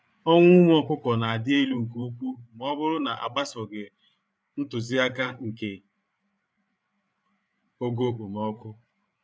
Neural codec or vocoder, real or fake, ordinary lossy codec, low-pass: codec, 16 kHz, 8 kbps, FreqCodec, larger model; fake; none; none